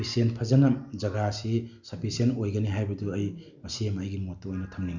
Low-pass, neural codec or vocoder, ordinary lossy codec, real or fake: 7.2 kHz; none; none; real